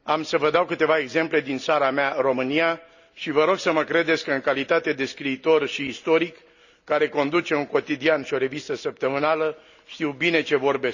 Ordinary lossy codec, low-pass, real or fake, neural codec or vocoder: none; 7.2 kHz; real; none